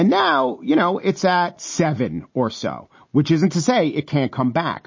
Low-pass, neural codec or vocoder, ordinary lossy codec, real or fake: 7.2 kHz; none; MP3, 32 kbps; real